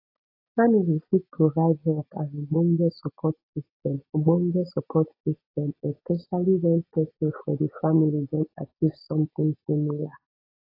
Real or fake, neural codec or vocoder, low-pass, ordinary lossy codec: real; none; 5.4 kHz; AAC, 32 kbps